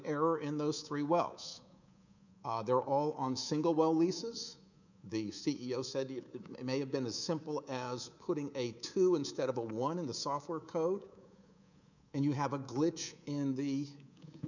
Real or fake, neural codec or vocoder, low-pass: fake; codec, 24 kHz, 3.1 kbps, DualCodec; 7.2 kHz